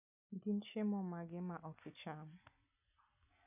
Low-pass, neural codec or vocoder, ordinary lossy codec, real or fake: 3.6 kHz; none; none; real